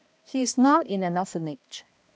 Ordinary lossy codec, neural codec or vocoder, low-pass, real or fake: none; codec, 16 kHz, 1 kbps, X-Codec, HuBERT features, trained on balanced general audio; none; fake